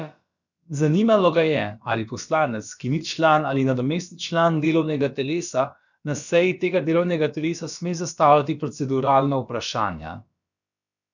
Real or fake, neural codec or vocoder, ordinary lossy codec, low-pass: fake; codec, 16 kHz, about 1 kbps, DyCAST, with the encoder's durations; none; 7.2 kHz